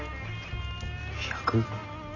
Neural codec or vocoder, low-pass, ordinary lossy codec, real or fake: none; 7.2 kHz; none; real